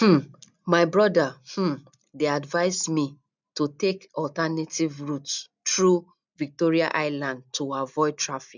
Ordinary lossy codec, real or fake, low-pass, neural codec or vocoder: none; real; 7.2 kHz; none